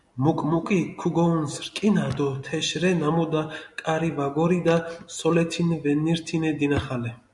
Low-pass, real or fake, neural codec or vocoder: 10.8 kHz; real; none